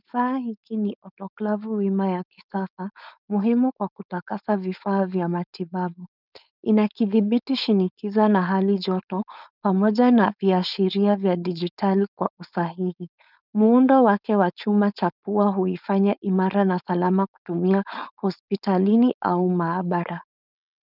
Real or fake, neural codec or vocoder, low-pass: fake; codec, 16 kHz, 4.8 kbps, FACodec; 5.4 kHz